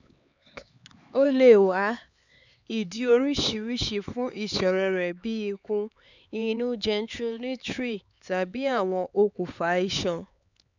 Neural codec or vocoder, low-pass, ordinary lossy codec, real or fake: codec, 16 kHz, 4 kbps, X-Codec, HuBERT features, trained on LibriSpeech; 7.2 kHz; none; fake